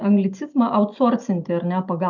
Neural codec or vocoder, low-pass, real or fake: none; 7.2 kHz; real